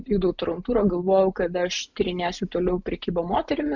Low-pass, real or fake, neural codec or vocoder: 7.2 kHz; real; none